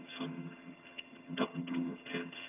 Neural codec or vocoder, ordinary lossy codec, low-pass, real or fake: vocoder, 22.05 kHz, 80 mel bands, HiFi-GAN; AAC, 32 kbps; 3.6 kHz; fake